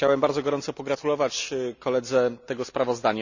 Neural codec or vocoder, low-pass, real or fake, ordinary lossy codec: none; 7.2 kHz; real; none